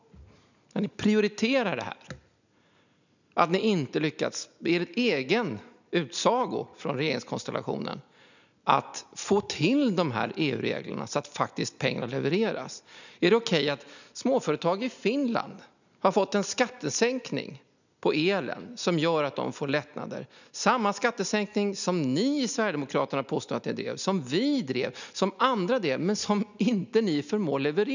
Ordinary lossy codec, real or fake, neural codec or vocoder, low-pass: none; real; none; 7.2 kHz